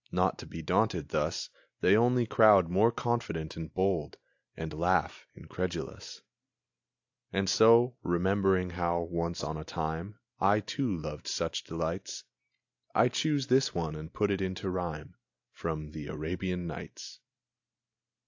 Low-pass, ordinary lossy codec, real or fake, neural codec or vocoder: 7.2 kHz; AAC, 48 kbps; real; none